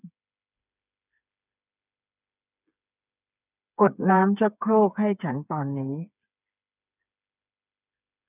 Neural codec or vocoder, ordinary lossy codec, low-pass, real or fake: codec, 16 kHz, 4 kbps, FreqCodec, smaller model; none; 3.6 kHz; fake